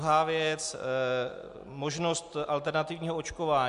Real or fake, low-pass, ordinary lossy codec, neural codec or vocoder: real; 10.8 kHz; MP3, 64 kbps; none